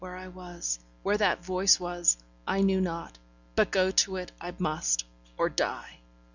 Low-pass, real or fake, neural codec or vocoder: 7.2 kHz; real; none